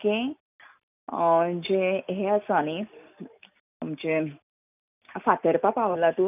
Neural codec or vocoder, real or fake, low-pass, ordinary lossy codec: none; real; 3.6 kHz; none